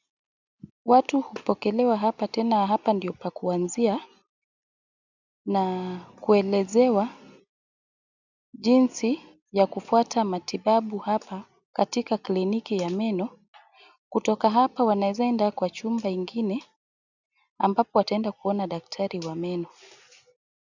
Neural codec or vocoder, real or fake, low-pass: none; real; 7.2 kHz